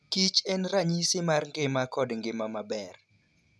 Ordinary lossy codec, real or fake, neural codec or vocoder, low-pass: none; real; none; none